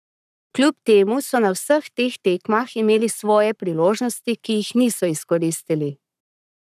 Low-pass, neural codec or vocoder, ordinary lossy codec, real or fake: 14.4 kHz; codec, 44.1 kHz, 7.8 kbps, Pupu-Codec; none; fake